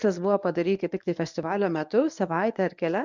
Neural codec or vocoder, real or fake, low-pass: codec, 24 kHz, 0.9 kbps, WavTokenizer, medium speech release version 1; fake; 7.2 kHz